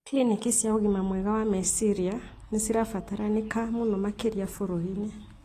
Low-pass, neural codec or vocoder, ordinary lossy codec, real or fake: 14.4 kHz; none; AAC, 48 kbps; real